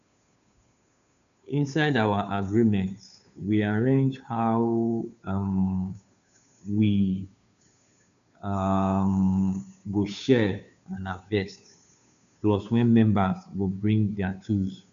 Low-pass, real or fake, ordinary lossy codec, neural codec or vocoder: 7.2 kHz; fake; none; codec, 16 kHz, 2 kbps, FunCodec, trained on Chinese and English, 25 frames a second